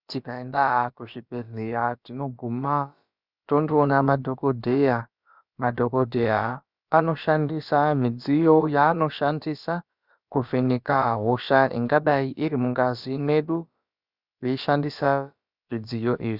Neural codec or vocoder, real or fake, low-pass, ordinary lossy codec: codec, 16 kHz, about 1 kbps, DyCAST, with the encoder's durations; fake; 5.4 kHz; Opus, 64 kbps